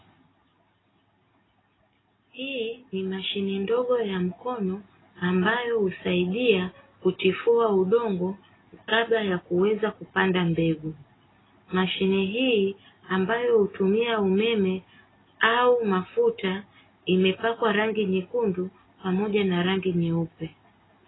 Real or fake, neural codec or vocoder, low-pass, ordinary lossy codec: real; none; 7.2 kHz; AAC, 16 kbps